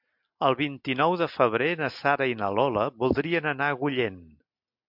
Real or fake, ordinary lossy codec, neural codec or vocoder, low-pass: real; MP3, 48 kbps; none; 5.4 kHz